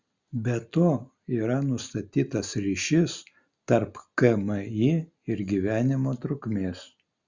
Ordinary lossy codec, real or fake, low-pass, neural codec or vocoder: Opus, 64 kbps; real; 7.2 kHz; none